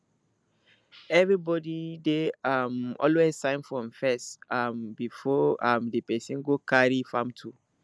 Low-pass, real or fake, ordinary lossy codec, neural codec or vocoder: none; real; none; none